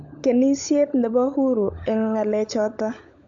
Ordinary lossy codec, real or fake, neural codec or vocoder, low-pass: AAC, 64 kbps; fake; codec, 16 kHz, 16 kbps, FunCodec, trained on LibriTTS, 50 frames a second; 7.2 kHz